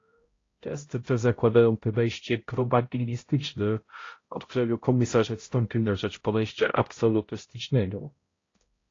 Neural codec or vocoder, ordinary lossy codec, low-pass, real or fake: codec, 16 kHz, 0.5 kbps, X-Codec, HuBERT features, trained on balanced general audio; AAC, 32 kbps; 7.2 kHz; fake